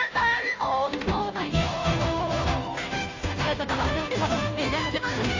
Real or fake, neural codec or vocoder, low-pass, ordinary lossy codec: fake; codec, 16 kHz, 0.5 kbps, FunCodec, trained on Chinese and English, 25 frames a second; 7.2 kHz; AAC, 32 kbps